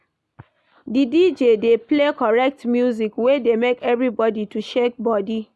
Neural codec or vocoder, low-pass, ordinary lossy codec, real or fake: none; none; none; real